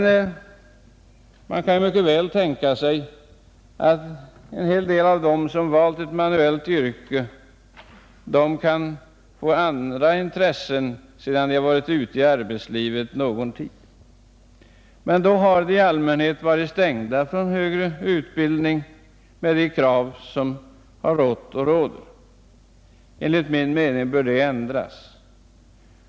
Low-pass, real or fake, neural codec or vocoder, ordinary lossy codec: none; real; none; none